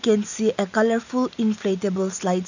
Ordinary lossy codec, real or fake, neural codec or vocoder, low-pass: none; real; none; 7.2 kHz